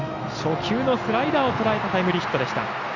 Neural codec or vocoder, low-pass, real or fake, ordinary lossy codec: none; 7.2 kHz; real; none